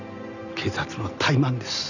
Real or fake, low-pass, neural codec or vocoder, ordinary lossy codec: real; 7.2 kHz; none; none